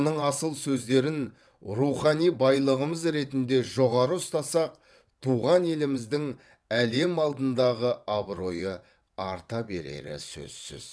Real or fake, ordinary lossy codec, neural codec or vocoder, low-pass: fake; none; vocoder, 22.05 kHz, 80 mel bands, WaveNeXt; none